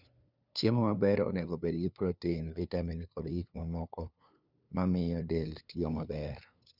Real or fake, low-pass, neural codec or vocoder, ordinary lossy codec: fake; 5.4 kHz; codec, 16 kHz, 2 kbps, FunCodec, trained on LibriTTS, 25 frames a second; none